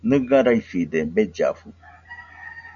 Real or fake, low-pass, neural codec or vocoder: real; 7.2 kHz; none